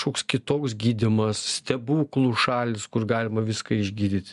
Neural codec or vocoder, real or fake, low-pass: none; real; 10.8 kHz